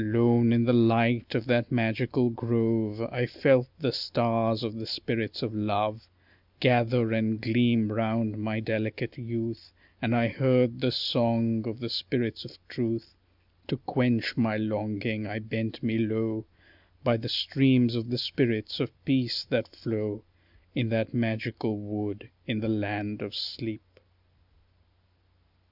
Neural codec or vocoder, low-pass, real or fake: none; 5.4 kHz; real